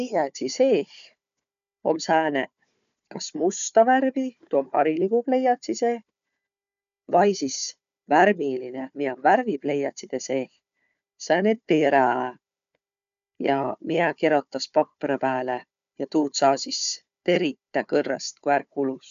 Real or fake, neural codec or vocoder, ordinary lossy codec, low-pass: fake; codec, 16 kHz, 4 kbps, FunCodec, trained on Chinese and English, 50 frames a second; none; 7.2 kHz